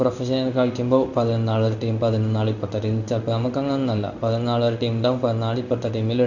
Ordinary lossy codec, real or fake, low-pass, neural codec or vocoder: none; fake; 7.2 kHz; codec, 16 kHz in and 24 kHz out, 1 kbps, XY-Tokenizer